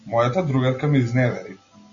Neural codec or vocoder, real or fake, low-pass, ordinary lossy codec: none; real; 7.2 kHz; MP3, 64 kbps